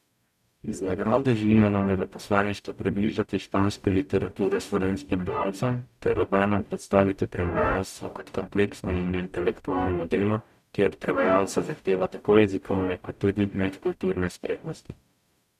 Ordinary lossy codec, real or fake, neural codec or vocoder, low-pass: none; fake; codec, 44.1 kHz, 0.9 kbps, DAC; 14.4 kHz